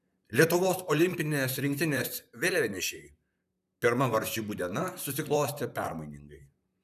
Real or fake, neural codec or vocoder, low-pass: fake; vocoder, 44.1 kHz, 128 mel bands, Pupu-Vocoder; 14.4 kHz